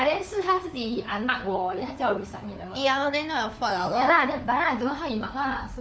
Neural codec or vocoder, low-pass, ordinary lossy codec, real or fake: codec, 16 kHz, 4 kbps, FunCodec, trained on LibriTTS, 50 frames a second; none; none; fake